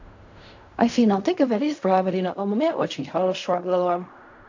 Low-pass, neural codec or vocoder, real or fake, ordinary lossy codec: 7.2 kHz; codec, 16 kHz in and 24 kHz out, 0.4 kbps, LongCat-Audio-Codec, fine tuned four codebook decoder; fake; AAC, 48 kbps